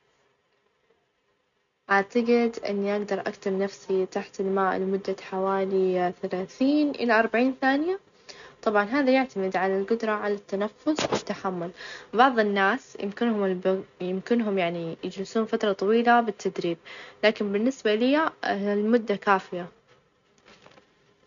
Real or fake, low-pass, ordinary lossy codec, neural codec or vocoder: real; 7.2 kHz; none; none